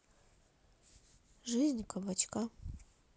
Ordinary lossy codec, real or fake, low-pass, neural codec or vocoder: none; real; none; none